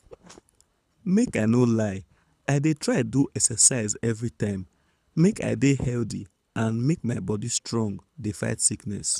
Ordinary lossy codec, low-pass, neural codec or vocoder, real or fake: none; none; codec, 24 kHz, 6 kbps, HILCodec; fake